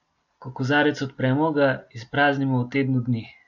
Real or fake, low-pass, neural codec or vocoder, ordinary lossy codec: real; 7.2 kHz; none; MP3, 48 kbps